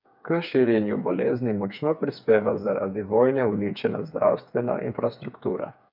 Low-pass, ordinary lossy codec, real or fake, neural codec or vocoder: 5.4 kHz; none; fake; codec, 16 kHz, 4 kbps, FreqCodec, smaller model